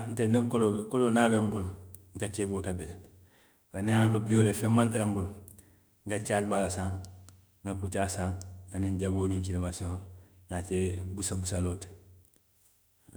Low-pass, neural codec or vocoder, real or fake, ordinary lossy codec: none; autoencoder, 48 kHz, 32 numbers a frame, DAC-VAE, trained on Japanese speech; fake; none